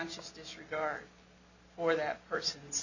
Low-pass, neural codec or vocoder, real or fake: 7.2 kHz; none; real